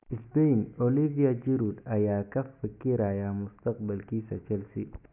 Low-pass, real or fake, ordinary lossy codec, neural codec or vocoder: 3.6 kHz; real; none; none